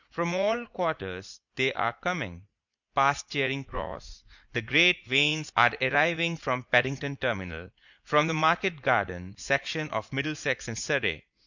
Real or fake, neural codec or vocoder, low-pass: fake; vocoder, 44.1 kHz, 80 mel bands, Vocos; 7.2 kHz